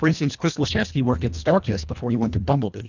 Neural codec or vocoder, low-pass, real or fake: codec, 24 kHz, 1.5 kbps, HILCodec; 7.2 kHz; fake